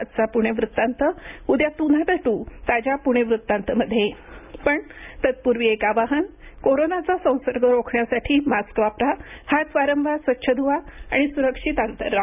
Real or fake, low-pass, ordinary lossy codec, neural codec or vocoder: real; 3.6 kHz; none; none